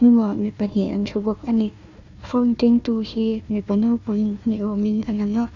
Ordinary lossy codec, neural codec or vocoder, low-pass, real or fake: none; codec, 16 kHz, 1 kbps, FunCodec, trained on Chinese and English, 50 frames a second; 7.2 kHz; fake